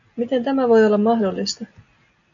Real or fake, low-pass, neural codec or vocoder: real; 7.2 kHz; none